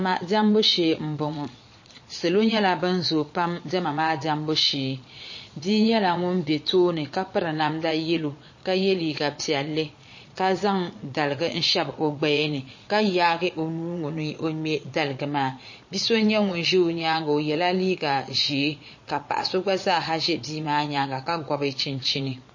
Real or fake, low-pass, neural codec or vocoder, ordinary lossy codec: fake; 7.2 kHz; vocoder, 22.05 kHz, 80 mel bands, Vocos; MP3, 32 kbps